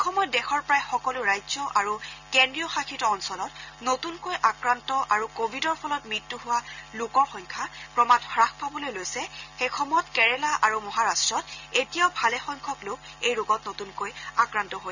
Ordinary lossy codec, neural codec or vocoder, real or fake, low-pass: none; none; real; 7.2 kHz